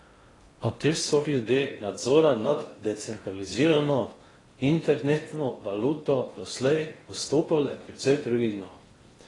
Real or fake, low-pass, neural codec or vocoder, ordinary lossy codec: fake; 10.8 kHz; codec, 16 kHz in and 24 kHz out, 0.6 kbps, FocalCodec, streaming, 4096 codes; AAC, 32 kbps